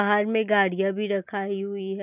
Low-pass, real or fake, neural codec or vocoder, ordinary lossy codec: 3.6 kHz; real; none; none